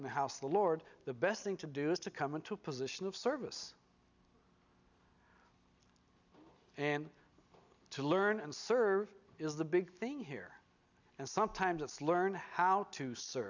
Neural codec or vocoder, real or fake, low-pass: none; real; 7.2 kHz